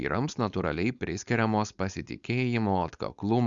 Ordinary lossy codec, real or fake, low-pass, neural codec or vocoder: Opus, 64 kbps; fake; 7.2 kHz; codec, 16 kHz, 4.8 kbps, FACodec